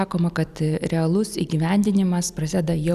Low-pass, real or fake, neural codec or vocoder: 14.4 kHz; real; none